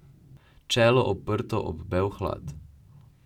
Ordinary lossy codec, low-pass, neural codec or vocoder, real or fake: none; 19.8 kHz; none; real